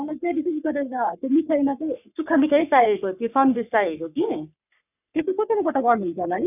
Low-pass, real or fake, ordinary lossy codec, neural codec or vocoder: 3.6 kHz; fake; none; codec, 44.1 kHz, 3.4 kbps, Pupu-Codec